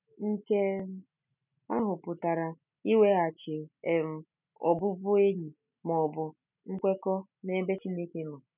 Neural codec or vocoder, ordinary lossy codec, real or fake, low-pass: none; none; real; 3.6 kHz